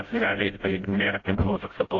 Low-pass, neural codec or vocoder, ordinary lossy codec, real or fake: 7.2 kHz; codec, 16 kHz, 0.5 kbps, FreqCodec, smaller model; AAC, 32 kbps; fake